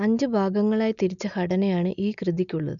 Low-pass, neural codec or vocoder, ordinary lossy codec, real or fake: 7.2 kHz; none; none; real